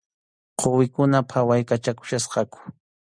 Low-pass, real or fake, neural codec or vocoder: 9.9 kHz; real; none